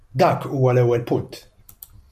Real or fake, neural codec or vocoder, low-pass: real; none; 14.4 kHz